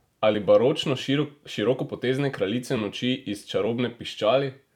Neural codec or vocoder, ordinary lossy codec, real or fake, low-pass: vocoder, 44.1 kHz, 128 mel bands every 256 samples, BigVGAN v2; none; fake; 19.8 kHz